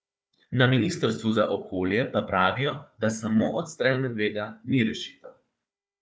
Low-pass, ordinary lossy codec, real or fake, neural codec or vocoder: none; none; fake; codec, 16 kHz, 4 kbps, FunCodec, trained on Chinese and English, 50 frames a second